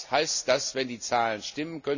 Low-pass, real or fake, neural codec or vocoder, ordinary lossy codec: 7.2 kHz; real; none; none